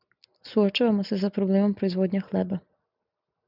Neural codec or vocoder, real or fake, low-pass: none; real; 5.4 kHz